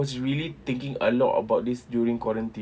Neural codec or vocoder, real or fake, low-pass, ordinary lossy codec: none; real; none; none